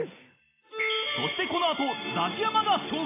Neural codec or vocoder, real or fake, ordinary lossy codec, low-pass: none; real; AAC, 16 kbps; 3.6 kHz